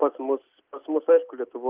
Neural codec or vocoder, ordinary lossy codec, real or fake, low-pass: none; Opus, 24 kbps; real; 3.6 kHz